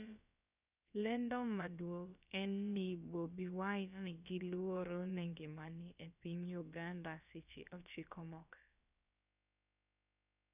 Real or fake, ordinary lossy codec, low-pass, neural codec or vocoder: fake; none; 3.6 kHz; codec, 16 kHz, about 1 kbps, DyCAST, with the encoder's durations